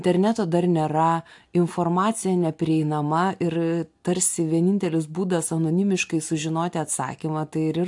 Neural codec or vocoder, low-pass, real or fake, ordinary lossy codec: none; 10.8 kHz; real; AAC, 64 kbps